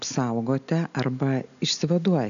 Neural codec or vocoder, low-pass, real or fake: none; 7.2 kHz; real